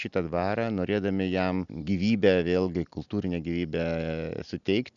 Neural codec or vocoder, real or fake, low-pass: none; real; 7.2 kHz